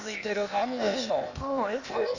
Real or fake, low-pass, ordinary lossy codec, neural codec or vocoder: fake; 7.2 kHz; none; codec, 16 kHz, 0.8 kbps, ZipCodec